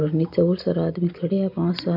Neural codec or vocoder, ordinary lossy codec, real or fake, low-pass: none; MP3, 48 kbps; real; 5.4 kHz